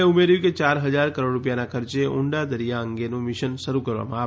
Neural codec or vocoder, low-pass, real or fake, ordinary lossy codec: none; none; real; none